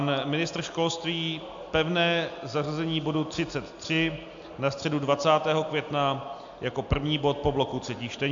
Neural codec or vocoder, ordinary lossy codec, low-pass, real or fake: none; AAC, 64 kbps; 7.2 kHz; real